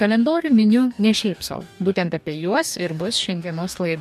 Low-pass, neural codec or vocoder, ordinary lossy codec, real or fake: 14.4 kHz; codec, 44.1 kHz, 2.6 kbps, DAC; MP3, 96 kbps; fake